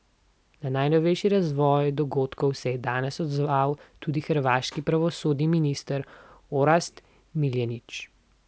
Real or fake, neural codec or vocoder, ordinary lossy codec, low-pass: real; none; none; none